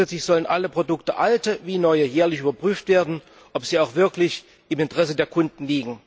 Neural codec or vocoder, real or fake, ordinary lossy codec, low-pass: none; real; none; none